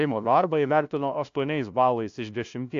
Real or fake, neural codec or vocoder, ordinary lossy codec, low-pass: fake; codec, 16 kHz, 0.5 kbps, FunCodec, trained on LibriTTS, 25 frames a second; AAC, 64 kbps; 7.2 kHz